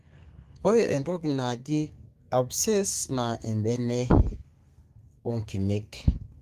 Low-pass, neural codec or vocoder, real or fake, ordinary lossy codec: 14.4 kHz; codec, 32 kHz, 1.9 kbps, SNAC; fake; Opus, 32 kbps